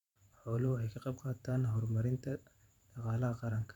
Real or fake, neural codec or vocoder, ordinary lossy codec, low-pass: fake; vocoder, 44.1 kHz, 128 mel bands every 512 samples, BigVGAN v2; none; 19.8 kHz